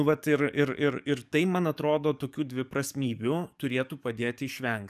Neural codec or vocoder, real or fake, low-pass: codec, 44.1 kHz, 7.8 kbps, DAC; fake; 14.4 kHz